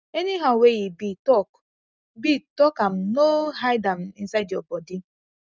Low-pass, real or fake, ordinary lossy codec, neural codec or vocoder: none; real; none; none